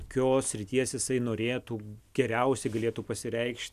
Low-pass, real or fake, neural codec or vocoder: 14.4 kHz; real; none